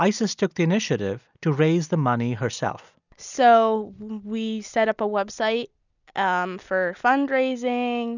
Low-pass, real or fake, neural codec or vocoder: 7.2 kHz; real; none